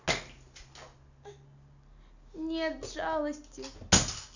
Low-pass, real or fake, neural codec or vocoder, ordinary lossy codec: 7.2 kHz; real; none; none